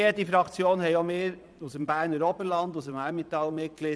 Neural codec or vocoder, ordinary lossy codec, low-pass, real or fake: vocoder, 22.05 kHz, 80 mel bands, Vocos; none; none; fake